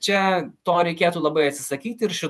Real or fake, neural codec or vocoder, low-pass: fake; vocoder, 48 kHz, 128 mel bands, Vocos; 14.4 kHz